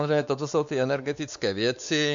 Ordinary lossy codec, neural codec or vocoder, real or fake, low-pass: MP3, 64 kbps; codec, 16 kHz, 4 kbps, X-Codec, WavLM features, trained on Multilingual LibriSpeech; fake; 7.2 kHz